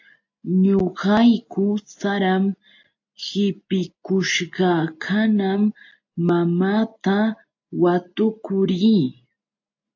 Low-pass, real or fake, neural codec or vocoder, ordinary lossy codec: 7.2 kHz; real; none; AAC, 48 kbps